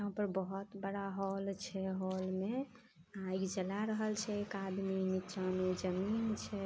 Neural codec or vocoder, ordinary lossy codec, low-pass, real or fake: none; none; none; real